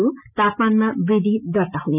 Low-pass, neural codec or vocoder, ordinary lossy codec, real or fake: 3.6 kHz; none; none; real